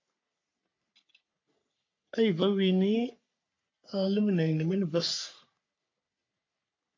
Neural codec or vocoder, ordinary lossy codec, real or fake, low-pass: codec, 44.1 kHz, 3.4 kbps, Pupu-Codec; MP3, 48 kbps; fake; 7.2 kHz